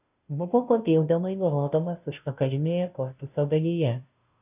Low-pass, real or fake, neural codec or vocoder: 3.6 kHz; fake; codec, 16 kHz, 0.5 kbps, FunCodec, trained on Chinese and English, 25 frames a second